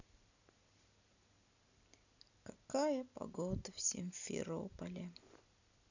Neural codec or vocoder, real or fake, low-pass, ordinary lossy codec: none; real; 7.2 kHz; none